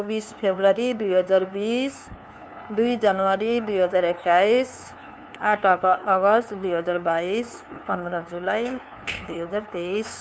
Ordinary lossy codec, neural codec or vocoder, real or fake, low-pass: none; codec, 16 kHz, 2 kbps, FunCodec, trained on LibriTTS, 25 frames a second; fake; none